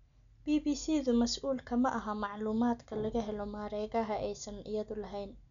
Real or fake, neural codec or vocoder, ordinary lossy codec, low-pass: real; none; none; 7.2 kHz